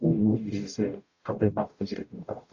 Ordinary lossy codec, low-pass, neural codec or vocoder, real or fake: AAC, 48 kbps; 7.2 kHz; codec, 44.1 kHz, 0.9 kbps, DAC; fake